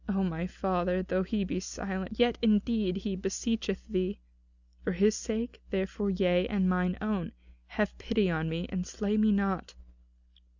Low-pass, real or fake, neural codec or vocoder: 7.2 kHz; real; none